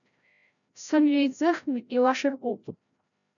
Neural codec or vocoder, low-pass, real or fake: codec, 16 kHz, 0.5 kbps, FreqCodec, larger model; 7.2 kHz; fake